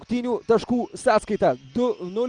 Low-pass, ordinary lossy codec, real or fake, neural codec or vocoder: 9.9 kHz; Opus, 32 kbps; real; none